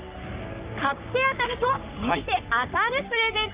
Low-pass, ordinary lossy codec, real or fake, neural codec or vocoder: 3.6 kHz; Opus, 32 kbps; fake; codec, 44.1 kHz, 3.4 kbps, Pupu-Codec